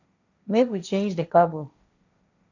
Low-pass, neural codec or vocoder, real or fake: 7.2 kHz; codec, 16 kHz, 1.1 kbps, Voila-Tokenizer; fake